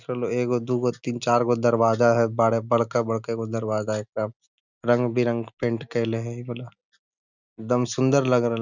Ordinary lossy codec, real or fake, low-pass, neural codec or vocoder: none; real; 7.2 kHz; none